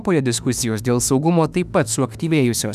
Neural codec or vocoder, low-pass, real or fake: autoencoder, 48 kHz, 32 numbers a frame, DAC-VAE, trained on Japanese speech; 14.4 kHz; fake